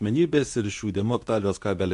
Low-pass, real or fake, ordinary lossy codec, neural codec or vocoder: 10.8 kHz; fake; AAC, 64 kbps; codec, 24 kHz, 0.9 kbps, WavTokenizer, medium speech release version 2